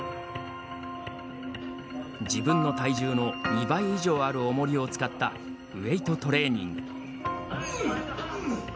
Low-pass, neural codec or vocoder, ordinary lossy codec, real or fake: none; none; none; real